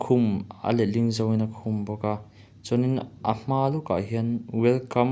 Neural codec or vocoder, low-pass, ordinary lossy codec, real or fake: none; none; none; real